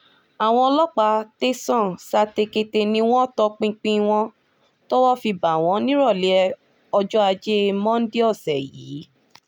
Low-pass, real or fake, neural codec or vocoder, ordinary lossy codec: 19.8 kHz; real; none; none